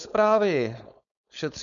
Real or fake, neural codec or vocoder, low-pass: fake; codec, 16 kHz, 4.8 kbps, FACodec; 7.2 kHz